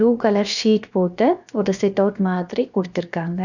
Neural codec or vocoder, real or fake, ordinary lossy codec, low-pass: codec, 16 kHz, about 1 kbps, DyCAST, with the encoder's durations; fake; none; 7.2 kHz